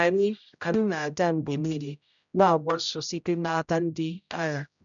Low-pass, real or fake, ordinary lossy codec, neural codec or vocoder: 7.2 kHz; fake; none; codec, 16 kHz, 0.5 kbps, X-Codec, HuBERT features, trained on general audio